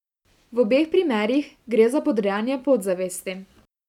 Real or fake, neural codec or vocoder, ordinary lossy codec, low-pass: real; none; none; 19.8 kHz